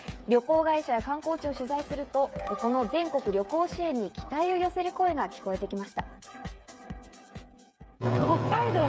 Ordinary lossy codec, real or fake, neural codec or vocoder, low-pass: none; fake; codec, 16 kHz, 8 kbps, FreqCodec, smaller model; none